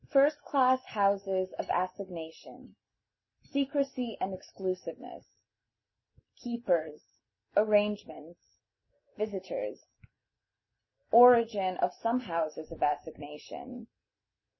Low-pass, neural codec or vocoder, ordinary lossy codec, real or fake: 7.2 kHz; none; MP3, 24 kbps; real